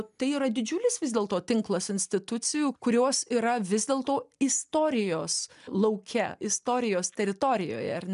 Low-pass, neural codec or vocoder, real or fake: 10.8 kHz; none; real